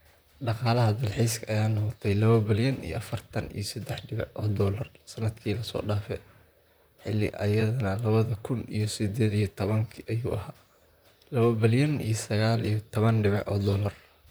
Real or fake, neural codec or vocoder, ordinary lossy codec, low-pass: fake; vocoder, 44.1 kHz, 128 mel bands, Pupu-Vocoder; none; none